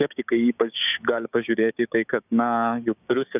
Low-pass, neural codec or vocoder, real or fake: 3.6 kHz; codec, 16 kHz, 6 kbps, DAC; fake